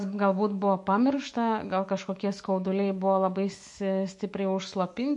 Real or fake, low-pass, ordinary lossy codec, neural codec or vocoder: fake; 10.8 kHz; MP3, 48 kbps; autoencoder, 48 kHz, 128 numbers a frame, DAC-VAE, trained on Japanese speech